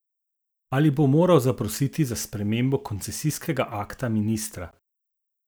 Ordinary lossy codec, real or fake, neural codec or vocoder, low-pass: none; real; none; none